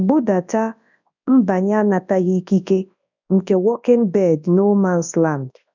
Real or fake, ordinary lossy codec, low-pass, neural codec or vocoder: fake; none; 7.2 kHz; codec, 24 kHz, 0.9 kbps, WavTokenizer, large speech release